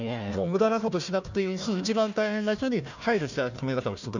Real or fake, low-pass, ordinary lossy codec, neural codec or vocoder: fake; 7.2 kHz; none; codec, 16 kHz, 1 kbps, FunCodec, trained on Chinese and English, 50 frames a second